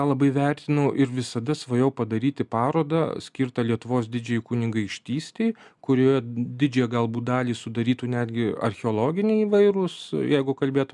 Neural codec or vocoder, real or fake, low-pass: none; real; 10.8 kHz